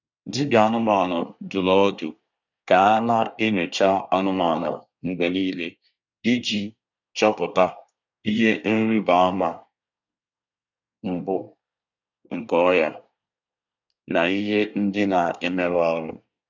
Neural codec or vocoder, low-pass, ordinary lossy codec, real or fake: codec, 24 kHz, 1 kbps, SNAC; 7.2 kHz; none; fake